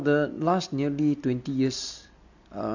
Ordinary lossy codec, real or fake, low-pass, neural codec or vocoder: none; real; 7.2 kHz; none